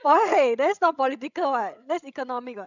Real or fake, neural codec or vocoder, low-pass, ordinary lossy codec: fake; codec, 16 kHz, 16 kbps, FreqCodec, larger model; 7.2 kHz; none